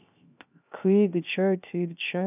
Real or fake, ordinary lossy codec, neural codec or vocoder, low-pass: fake; none; codec, 16 kHz, 0.3 kbps, FocalCodec; 3.6 kHz